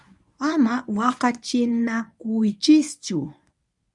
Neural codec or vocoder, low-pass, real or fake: codec, 24 kHz, 0.9 kbps, WavTokenizer, medium speech release version 1; 10.8 kHz; fake